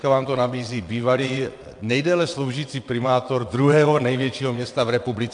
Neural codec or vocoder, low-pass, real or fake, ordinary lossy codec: vocoder, 22.05 kHz, 80 mel bands, WaveNeXt; 9.9 kHz; fake; AAC, 64 kbps